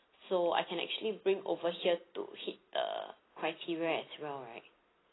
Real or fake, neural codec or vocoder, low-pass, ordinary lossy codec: real; none; 7.2 kHz; AAC, 16 kbps